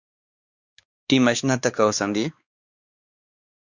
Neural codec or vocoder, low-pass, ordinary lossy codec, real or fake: codec, 16 kHz, 2 kbps, X-Codec, WavLM features, trained on Multilingual LibriSpeech; 7.2 kHz; Opus, 64 kbps; fake